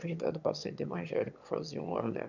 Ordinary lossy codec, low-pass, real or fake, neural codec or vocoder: none; 7.2 kHz; fake; autoencoder, 22.05 kHz, a latent of 192 numbers a frame, VITS, trained on one speaker